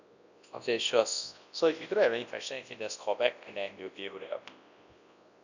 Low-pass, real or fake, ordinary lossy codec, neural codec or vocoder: 7.2 kHz; fake; none; codec, 24 kHz, 0.9 kbps, WavTokenizer, large speech release